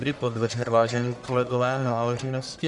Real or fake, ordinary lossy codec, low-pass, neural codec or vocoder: fake; MP3, 96 kbps; 10.8 kHz; codec, 44.1 kHz, 1.7 kbps, Pupu-Codec